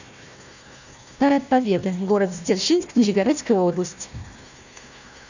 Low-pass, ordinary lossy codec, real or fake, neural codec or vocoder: 7.2 kHz; none; fake; codec, 16 kHz, 1 kbps, FunCodec, trained on Chinese and English, 50 frames a second